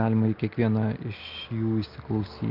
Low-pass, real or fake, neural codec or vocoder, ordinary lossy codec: 5.4 kHz; real; none; Opus, 32 kbps